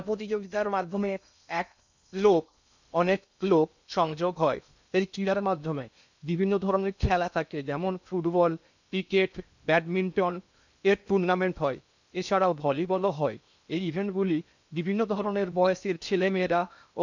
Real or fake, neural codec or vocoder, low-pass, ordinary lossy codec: fake; codec, 16 kHz in and 24 kHz out, 0.6 kbps, FocalCodec, streaming, 2048 codes; 7.2 kHz; none